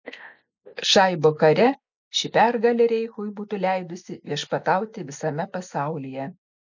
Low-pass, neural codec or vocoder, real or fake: 7.2 kHz; none; real